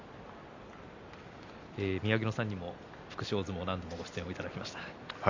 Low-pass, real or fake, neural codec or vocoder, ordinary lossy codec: 7.2 kHz; real; none; MP3, 64 kbps